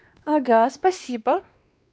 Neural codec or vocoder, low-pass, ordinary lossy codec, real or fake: codec, 16 kHz, 2 kbps, X-Codec, WavLM features, trained on Multilingual LibriSpeech; none; none; fake